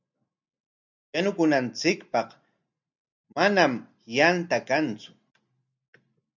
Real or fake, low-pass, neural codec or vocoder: real; 7.2 kHz; none